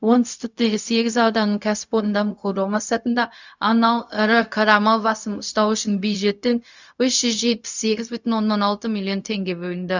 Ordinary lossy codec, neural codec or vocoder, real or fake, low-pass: none; codec, 16 kHz, 0.4 kbps, LongCat-Audio-Codec; fake; 7.2 kHz